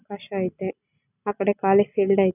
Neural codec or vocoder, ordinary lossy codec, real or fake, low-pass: none; none; real; 3.6 kHz